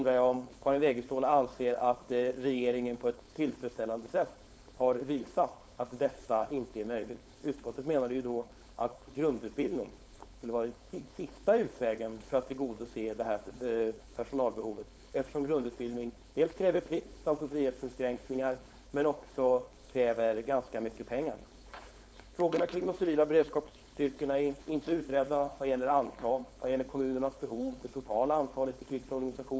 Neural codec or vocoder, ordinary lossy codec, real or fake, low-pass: codec, 16 kHz, 4.8 kbps, FACodec; none; fake; none